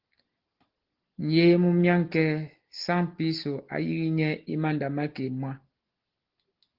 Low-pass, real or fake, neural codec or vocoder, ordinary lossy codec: 5.4 kHz; real; none; Opus, 16 kbps